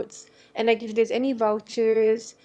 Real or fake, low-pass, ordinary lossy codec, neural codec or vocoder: fake; none; none; autoencoder, 22.05 kHz, a latent of 192 numbers a frame, VITS, trained on one speaker